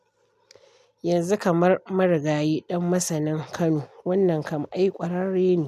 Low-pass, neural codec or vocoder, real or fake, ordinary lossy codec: 14.4 kHz; none; real; none